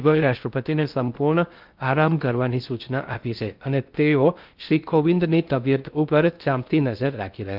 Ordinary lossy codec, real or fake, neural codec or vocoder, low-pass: Opus, 24 kbps; fake; codec, 16 kHz in and 24 kHz out, 0.6 kbps, FocalCodec, streaming, 2048 codes; 5.4 kHz